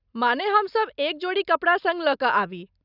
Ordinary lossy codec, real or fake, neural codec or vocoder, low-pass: none; real; none; 5.4 kHz